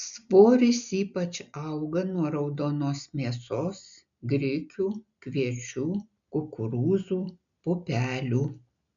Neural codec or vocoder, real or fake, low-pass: none; real; 7.2 kHz